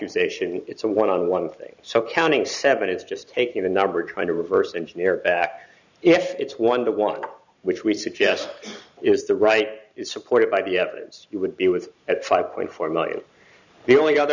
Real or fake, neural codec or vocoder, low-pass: fake; vocoder, 44.1 kHz, 128 mel bands every 512 samples, BigVGAN v2; 7.2 kHz